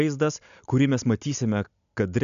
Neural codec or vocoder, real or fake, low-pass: none; real; 7.2 kHz